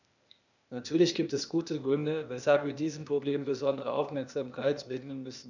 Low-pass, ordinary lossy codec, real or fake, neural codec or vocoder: 7.2 kHz; none; fake; codec, 16 kHz, 0.8 kbps, ZipCodec